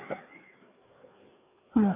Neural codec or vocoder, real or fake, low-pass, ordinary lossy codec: codec, 16 kHz, 4 kbps, FreqCodec, larger model; fake; 3.6 kHz; AAC, 24 kbps